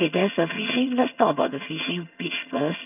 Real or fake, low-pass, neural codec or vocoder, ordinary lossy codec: fake; 3.6 kHz; vocoder, 22.05 kHz, 80 mel bands, HiFi-GAN; none